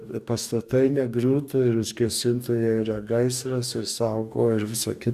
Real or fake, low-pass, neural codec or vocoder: fake; 14.4 kHz; codec, 44.1 kHz, 2.6 kbps, DAC